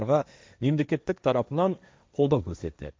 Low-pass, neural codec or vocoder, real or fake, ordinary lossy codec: none; codec, 16 kHz, 1.1 kbps, Voila-Tokenizer; fake; none